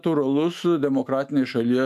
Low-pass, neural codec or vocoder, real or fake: 14.4 kHz; autoencoder, 48 kHz, 128 numbers a frame, DAC-VAE, trained on Japanese speech; fake